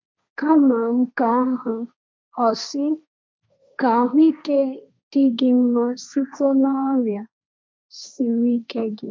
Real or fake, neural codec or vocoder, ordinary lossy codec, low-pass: fake; codec, 16 kHz, 1.1 kbps, Voila-Tokenizer; none; none